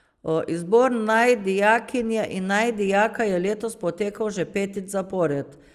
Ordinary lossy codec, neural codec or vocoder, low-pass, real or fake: Opus, 32 kbps; none; 14.4 kHz; real